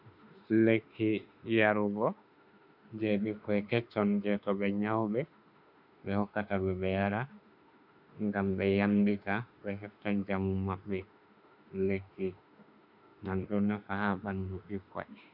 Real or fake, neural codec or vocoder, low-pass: fake; autoencoder, 48 kHz, 32 numbers a frame, DAC-VAE, trained on Japanese speech; 5.4 kHz